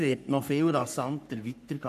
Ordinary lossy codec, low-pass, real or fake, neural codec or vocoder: none; 14.4 kHz; fake; codec, 44.1 kHz, 3.4 kbps, Pupu-Codec